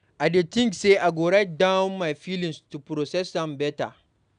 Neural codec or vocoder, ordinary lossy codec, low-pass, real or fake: none; none; 9.9 kHz; real